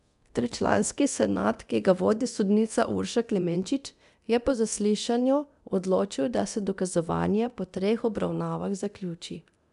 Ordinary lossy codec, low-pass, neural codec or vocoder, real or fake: none; 10.8 kHz; codec, 24 kHz, 0.9 kbps, DualCodec; fake